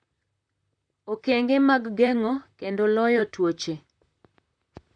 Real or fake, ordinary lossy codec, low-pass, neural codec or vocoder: fake; none; 9.9 kHz; vocoder, 44.1 kHz, 128 mel bands, Pupu-Vocoder